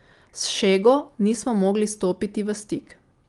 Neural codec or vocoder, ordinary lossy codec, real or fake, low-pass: none; Opus, 32 kbps; real; 10.8 kHz